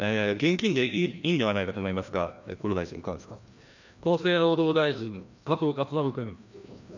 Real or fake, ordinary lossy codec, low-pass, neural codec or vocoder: fake; none; 7.2 kHz; codec, 16 kHz, 1 kbps, FreqCodec, larger model